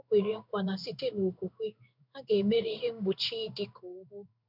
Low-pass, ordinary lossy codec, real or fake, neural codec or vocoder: 5.4 kHz; none; fake; codec, 16 kHz in and 24 kHz out, 1 kbps, XY-Tokenizer